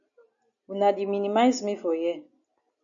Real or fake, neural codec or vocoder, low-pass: real; none; 7.2 kHz